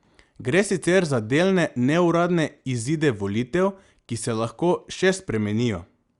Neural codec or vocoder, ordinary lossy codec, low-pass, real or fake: none; Opus, 64 kbps; 10.8 kHz; real